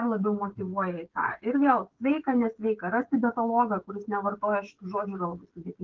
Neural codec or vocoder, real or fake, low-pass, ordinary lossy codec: codec, 16 kHz, 16 kbps, FreqCodec, smaller model; fake; 7.2 kHz; Opus, 16 kbps